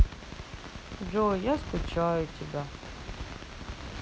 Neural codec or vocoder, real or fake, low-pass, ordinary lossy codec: none; real; none; none